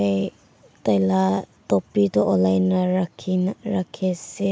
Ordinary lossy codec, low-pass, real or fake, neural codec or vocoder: none; none; real; none